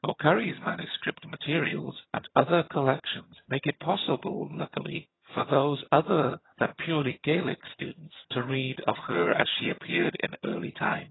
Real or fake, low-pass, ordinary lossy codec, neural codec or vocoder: fake; 7.2 kHz; AAC, 16 kbps; vocoder, 22.05 kHz, 80 mel bands, HiFi-GAN